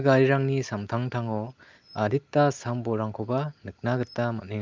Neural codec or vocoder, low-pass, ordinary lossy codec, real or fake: none; 7.2 kHz; Opus, 24 kbps; real